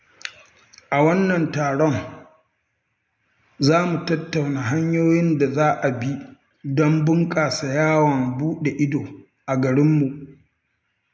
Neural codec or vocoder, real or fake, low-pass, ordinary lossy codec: none; real; none; none